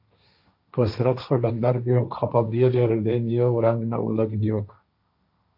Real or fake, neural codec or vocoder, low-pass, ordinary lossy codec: fake; codec, 16 kHz, 1.1 kbps, Voila-Tokenizer; 5.4 kHz; AAC, 48 kbps